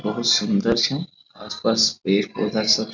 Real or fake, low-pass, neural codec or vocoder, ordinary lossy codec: real; 7.2 kHz; none; none